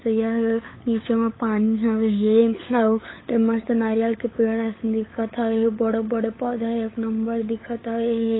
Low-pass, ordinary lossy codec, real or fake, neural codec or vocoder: 7.2 kHz; AAC, 16 kbps; fake; codec, 16 kHz, 8 kbps, FunCodec, trained on LibriTTS, 25 frames a second